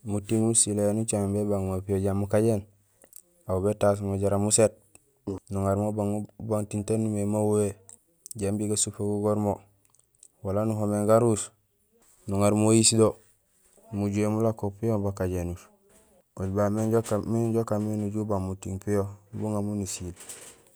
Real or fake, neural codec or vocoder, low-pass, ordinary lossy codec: real; none; none; none